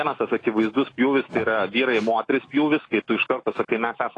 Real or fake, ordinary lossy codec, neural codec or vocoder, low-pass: fake; AAC, 32 kbps; autoencoder, 48 kHz, 128 numbers a frame, DAC-VAE, trained on Japanese speech; 10.8 kHz